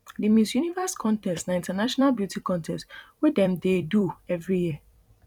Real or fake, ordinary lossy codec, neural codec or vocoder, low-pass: fake; none; vocoder, 44.1 kHz, 128 mel bands every 256 samples, BigVGAN v2; 19.8 kHz